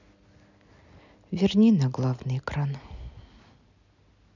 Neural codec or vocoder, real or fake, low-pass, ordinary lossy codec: none; real; 7.2 kHz; none